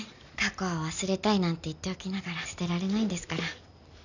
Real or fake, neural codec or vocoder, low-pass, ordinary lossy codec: real; none; 7.2 kHz; none